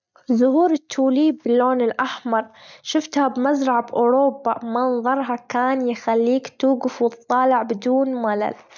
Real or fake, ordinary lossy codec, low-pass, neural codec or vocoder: real; none; 7.2 kHz; none